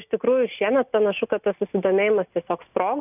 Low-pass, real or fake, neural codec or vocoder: 3.6 kHz; real; none